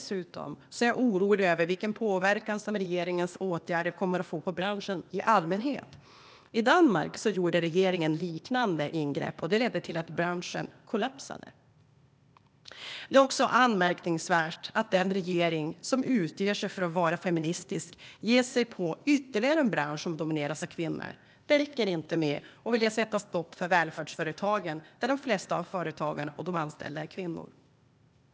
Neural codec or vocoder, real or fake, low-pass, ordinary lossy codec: codec, 16 kHz, 0.8 kbps, ZipCodec; fake; none; none